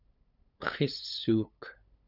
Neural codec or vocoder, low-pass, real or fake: codec, 16 kHz, 8 kbps, FunCodec, trained on LibriTTS, 25 frames a second; 5.4 kHz; fake